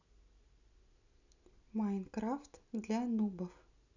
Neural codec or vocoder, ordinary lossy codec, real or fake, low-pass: none; none; real; 7.2 kHz